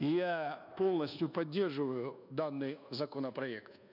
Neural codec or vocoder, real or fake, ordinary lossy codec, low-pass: codec, 24 kHz, 1.2 kbps, DualCodec; fake; none; 5.4 kHz